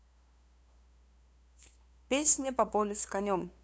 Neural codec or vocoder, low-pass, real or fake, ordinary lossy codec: codec, 16 kHz, 2 kbps, FunCodec, trained on LibriTTS, 25 frames a second; none; fake; none